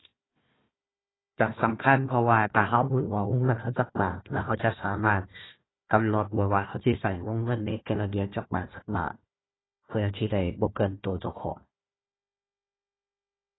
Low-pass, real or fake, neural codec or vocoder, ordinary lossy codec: 7.2 kHz; fake; codec, 16 kHz, 1 kbps, FunCodec, trained on Chinese and English, 50 frames a second; AAC, 16 kbps